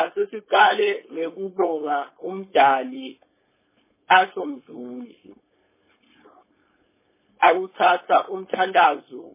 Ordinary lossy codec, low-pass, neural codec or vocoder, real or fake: MP3, 16 kbps; 3.6 kHz; codec, 16 kHz, 4.8 kbps, FACodec; fake